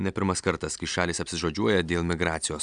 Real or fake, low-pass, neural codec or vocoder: real; 9.9 kHz; none